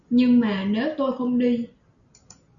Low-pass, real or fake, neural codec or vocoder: 7.2 kHz; real; none